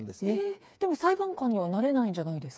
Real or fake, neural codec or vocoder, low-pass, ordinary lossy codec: fake; codec, 16 kHz, 4 kbps, FreqCodec, smaller model; none; none